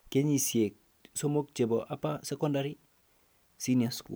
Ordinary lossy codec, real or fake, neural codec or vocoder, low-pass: none; real; none; none